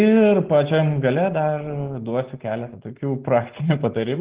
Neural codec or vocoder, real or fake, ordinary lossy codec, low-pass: none; real; Opus, 16 kbps; 3.6 kHz